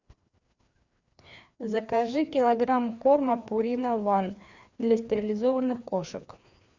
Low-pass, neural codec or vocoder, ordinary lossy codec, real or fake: 7.2 kHz; codec, 16 kHz, 2 kbps, FreqCodec, larger model; Opus, 64 kbps; fake